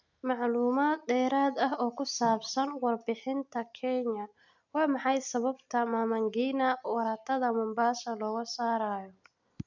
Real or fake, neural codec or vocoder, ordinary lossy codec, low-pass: real; none; none; 7.2 kHz